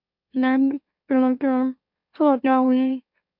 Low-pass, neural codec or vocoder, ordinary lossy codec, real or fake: 5.4 kHz; autoencoder, 44.1 kHz, a latent of 192 numbers a frame, MeloTTS; MP3, 48 kbps; fake